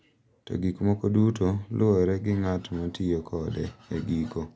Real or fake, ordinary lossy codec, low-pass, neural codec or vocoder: real; none; none; none